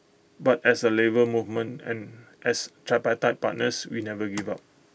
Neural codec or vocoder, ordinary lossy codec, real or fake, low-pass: none; none; real; none